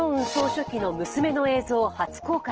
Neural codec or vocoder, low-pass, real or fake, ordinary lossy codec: none; 7.2 kHz; real; Opus, 16 kbps